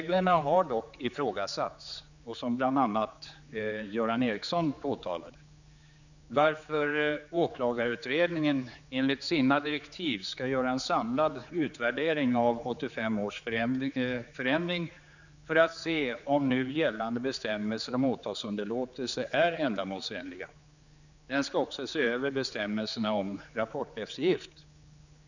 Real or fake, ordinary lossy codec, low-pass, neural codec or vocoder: fake; none; 7.2 kHz; codec, 16 kHz, 4 kbps, X-Codec, HuBERT features, trained on general audio